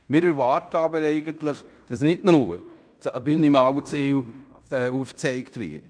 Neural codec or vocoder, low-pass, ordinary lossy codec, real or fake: codec, 16 kHz in and 24 kHz out, 0.9 kbps, LongCat-Audio-Codec, fine tuned four codebook decoder; 9.9 kHz; none; fake